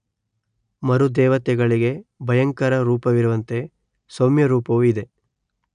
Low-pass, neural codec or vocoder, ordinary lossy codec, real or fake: 9.9 kHz; none; none; real